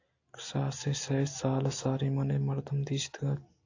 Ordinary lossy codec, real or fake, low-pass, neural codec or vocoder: AAC, 48 kbps; real; 7.2 kHz; none